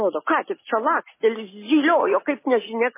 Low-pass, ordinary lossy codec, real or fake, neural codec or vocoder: 3.6 kHz; MP3, 16 kbps; real; none